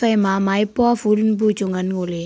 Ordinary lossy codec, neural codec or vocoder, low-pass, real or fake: none; none; none; real